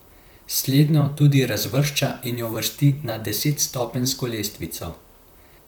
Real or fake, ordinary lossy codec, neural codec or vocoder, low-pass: fake; none; vocoder, 44.1 kHz, 128 mel bands, Pupu-Vocoder; none